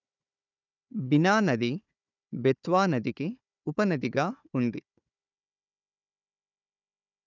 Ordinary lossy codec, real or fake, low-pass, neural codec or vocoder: none; fake; 7.2 kHz; codec, 16 kHz, 4 kbps, FunCodec, trained on Chinese and English, 50 frames a second